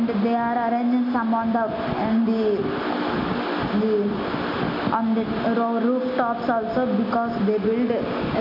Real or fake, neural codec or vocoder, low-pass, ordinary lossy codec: real; none; 5.4 kHz; none